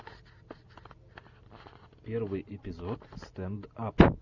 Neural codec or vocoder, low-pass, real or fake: none; 7.2 kHz; real